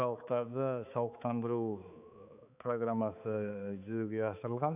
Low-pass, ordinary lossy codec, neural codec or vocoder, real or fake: 3.6 kHz; none; codec, 16 kHz, 4 kbps, X-Codec, HuBERT features, trained on balanced general audio; fake